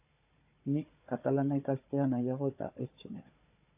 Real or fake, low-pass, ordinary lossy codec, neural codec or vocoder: fake; 3.6 kHz; AAC, 32 kbps; codec, 16 kHz, 4 kbps, FunCodec, trained on Chinese and English, 50 frames a second